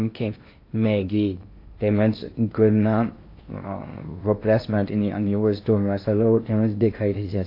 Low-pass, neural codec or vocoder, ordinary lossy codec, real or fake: 5.4 kHz; codec, 16 kHz in and 24 kHz out, 0.6 kbps, FocalCodec, streaming, 2048 codes; AAC, 32 kbps; fake